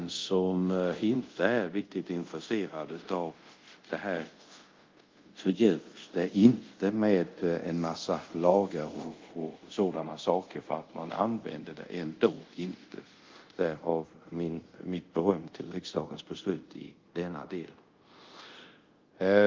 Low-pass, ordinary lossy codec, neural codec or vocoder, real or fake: 7.2 kHz; Opus, 24 kbps; codec, 24 kHz, 0.5 kbps, DualCodec; fake